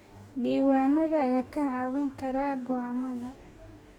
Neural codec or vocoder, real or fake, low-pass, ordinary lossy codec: codec, 44.1 kHz, 2.6 kbps, DAC; fake; 19.8 kHz; none